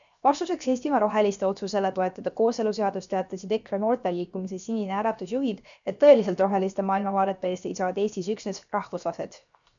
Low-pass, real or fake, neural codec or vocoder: 7.2 kHz; fake; codec, 16 kHz, 0.7 kbps, FocalCodec